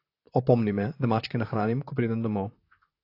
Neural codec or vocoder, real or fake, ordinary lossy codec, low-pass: vocoder, 44.1 kHz, 128 mel bands, Pupu-Vocoder; fake; AAC, 32 kbps; 5.4 kHz